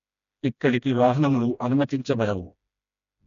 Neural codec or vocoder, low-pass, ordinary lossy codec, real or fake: codec, 16 kHz, 1 kbps, FreqCodec, smaller model; 7.2 kHz; none; fake